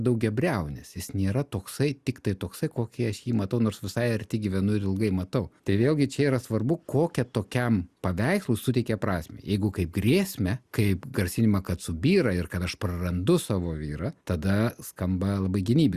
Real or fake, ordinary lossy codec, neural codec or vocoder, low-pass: real; Opus, 64 kbps; none; 14.4 kHz